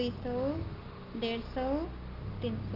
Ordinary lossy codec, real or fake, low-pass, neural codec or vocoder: Opus, 32 kbps; real; 5.4 kHz; none